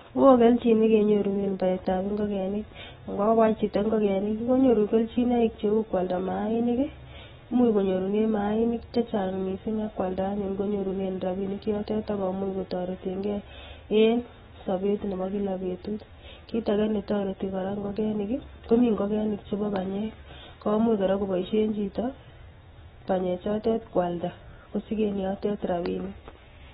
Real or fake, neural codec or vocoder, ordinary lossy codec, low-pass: fake; autoencoder, 48 kHz, 128 numbers a frame, DAC-VAE, trained on Japanese speech; AAC, 16 kbps; 19.8 kHz